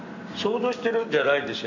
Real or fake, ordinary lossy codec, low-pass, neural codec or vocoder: real; none; 7.2 kHz; none